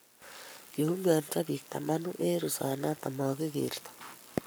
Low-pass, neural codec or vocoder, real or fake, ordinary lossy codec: none; codec, 44.1 kHz, 7.8 kbps, Pupu-Codec; fake; none